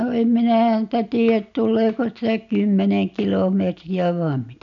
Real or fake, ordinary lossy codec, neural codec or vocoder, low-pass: real; none; none; 7.2 kHz